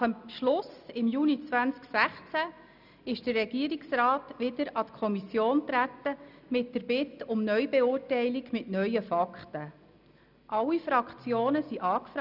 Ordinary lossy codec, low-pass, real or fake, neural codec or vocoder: none; 5.4 kHz; real; none